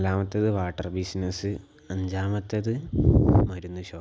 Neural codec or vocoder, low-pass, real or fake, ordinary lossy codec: none; none; real; none